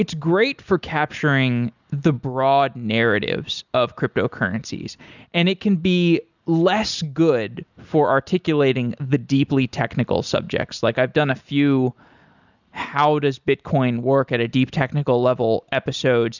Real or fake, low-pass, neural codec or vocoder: real; 7.2 kHz; none